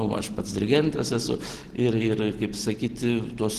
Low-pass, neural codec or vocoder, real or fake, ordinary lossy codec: 14.4 kHz; none; real; Opus, 16 kbps